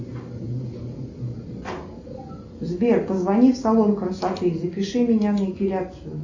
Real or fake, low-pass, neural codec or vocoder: real; 7.2 kHz; none